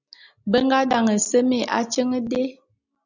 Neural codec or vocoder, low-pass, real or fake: none; 7.2 kHz; real